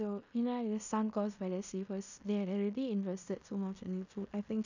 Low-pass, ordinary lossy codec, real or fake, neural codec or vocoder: 7.2 kHz; none; fake; codec, 16 kHz in and 24 kHz out, 0.9 kbps, LongCat-Audio-Codec, fine tuned four codebook decoder